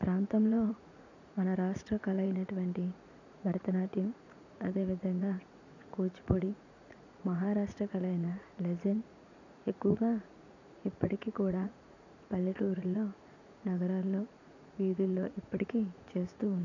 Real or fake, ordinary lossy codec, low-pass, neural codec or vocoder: fake; none; 7.2 kHz; vocoder, 44.1 kHz, 80 mel bands, Vocos